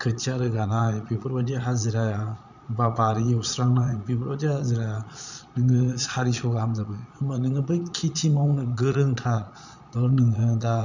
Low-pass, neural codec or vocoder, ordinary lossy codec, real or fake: 7.2 kHz; vocoder, 22.05 kHz, 80 mel bands, Vocos; none; fake